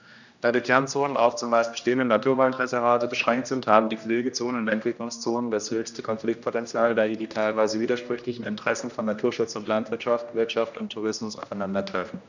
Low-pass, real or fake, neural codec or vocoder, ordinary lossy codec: 7.2 kHz; fake; codec, 16 kHz, 1 kbps, X-Codec, HuBERT features, trained on general audio; none